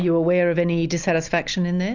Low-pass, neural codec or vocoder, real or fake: 7.2 kHz; none; real